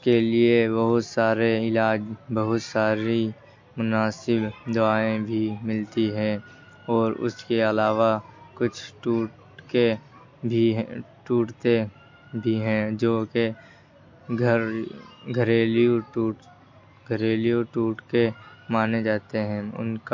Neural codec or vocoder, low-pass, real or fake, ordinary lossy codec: none; 7.2 kHz; real; MP3, 48 kbps